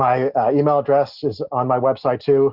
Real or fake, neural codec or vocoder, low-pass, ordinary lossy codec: real; none; 5.4 kHz; Opus, 64 kbps